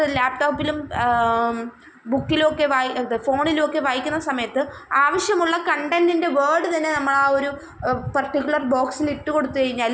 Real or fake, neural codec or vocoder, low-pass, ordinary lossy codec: real; none; none; none